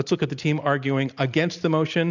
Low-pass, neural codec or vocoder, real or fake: 7.2 kHz; none; real